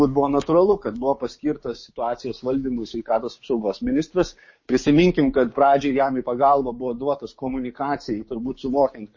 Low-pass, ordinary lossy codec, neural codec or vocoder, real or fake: 7.2 kHz; MP3, 32 kbps; codec, 16 kHz, 6 kbps, DAC; fake